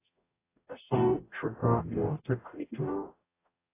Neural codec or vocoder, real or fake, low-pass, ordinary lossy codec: codec, 44.1 kHz, 0.9 kbps, DAC; fake; 3.6 kHz; AAC, 24 kbps